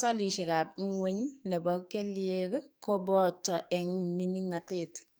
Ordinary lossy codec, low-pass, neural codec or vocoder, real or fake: none; none; codec, 44.1 kHz, 2.6 kbps, SNAC; fake